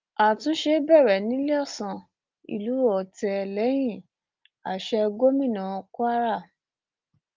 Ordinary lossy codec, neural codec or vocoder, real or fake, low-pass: Opus, 32 kbps; none; real; 7.2 kHz